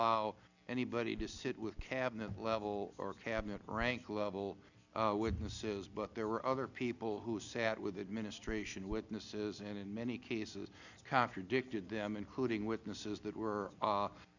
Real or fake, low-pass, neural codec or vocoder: real; 7.2 kHz; none